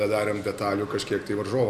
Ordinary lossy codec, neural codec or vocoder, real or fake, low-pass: AAC, 96 kbps; none; real; 14.4 kHz